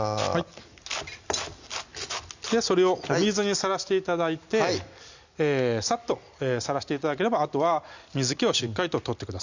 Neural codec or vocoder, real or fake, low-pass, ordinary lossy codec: none; real; 7.2 kHz; Opus, 64 kbps